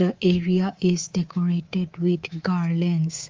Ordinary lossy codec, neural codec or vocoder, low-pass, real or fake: Opus, 32 kbps; codec, 24 kHz, 3.1 kbps, DualCodec; 7.2 kHz; fake